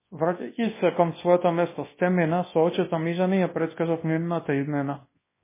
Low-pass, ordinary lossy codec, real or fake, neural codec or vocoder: 3.6 kHz; MP3, 16 kbps; fake; codec, 24 kHz, 0.9 kbps, WavTokenizer, large speech release